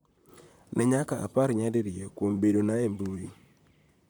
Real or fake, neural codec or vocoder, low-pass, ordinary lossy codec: fake; vocoder, 44.1 kHz, 128 mel bands, Pupu-Vocoder; none; none